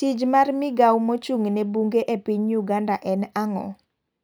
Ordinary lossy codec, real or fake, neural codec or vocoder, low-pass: none; real; none; none